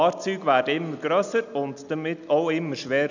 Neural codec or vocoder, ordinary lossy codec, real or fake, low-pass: none; none; real; 7.2 kHz